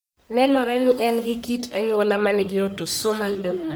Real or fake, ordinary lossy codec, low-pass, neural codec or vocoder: fake; none; none; codec, 44.1 kHz, 1.7 kbps, Pupu-Codec